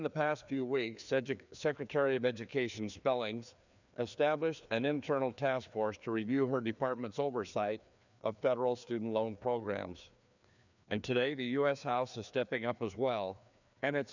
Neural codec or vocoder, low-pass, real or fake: codec, 16 kHz, 2 kbps, FreqCodec, larger model; 7.2 kHz; fake